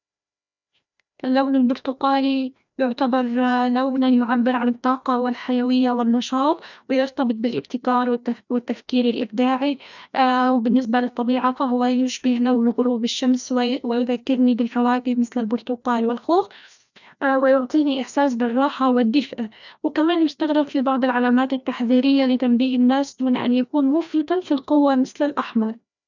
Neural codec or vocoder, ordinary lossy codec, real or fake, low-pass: codec, 16 kHz, 1 kbps, FreqCodec, larger model; none; fake; 7.2 kHz